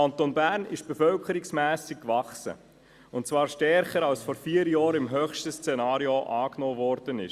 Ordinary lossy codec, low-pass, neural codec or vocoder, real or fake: Opus, 64 kbps; 14.4 kHz; none; real